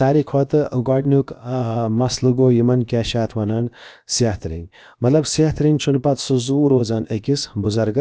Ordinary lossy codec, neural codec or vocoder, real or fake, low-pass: none; codec, 16 kHz, about 1 kbps, DyCAST, with the encoder's durations; fake; none